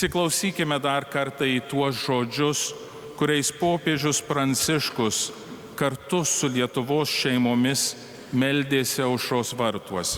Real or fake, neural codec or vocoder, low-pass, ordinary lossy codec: real; none; 19.8 kHz; Opus, 64 kbps